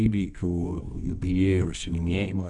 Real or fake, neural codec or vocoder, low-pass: fake; codec, 24 kHz, 0.9 kbps, WavTokenizer, medium music audio release; 10.8 kHz